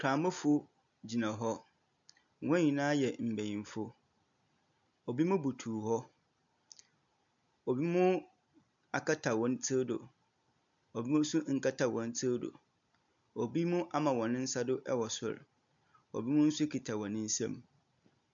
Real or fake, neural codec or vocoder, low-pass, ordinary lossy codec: real; none; 7.2 kHz; MP3, 64 kbps